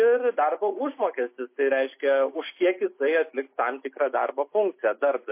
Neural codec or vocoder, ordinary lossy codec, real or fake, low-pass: none; MP3, 24 kbps; real; 3.6 kHz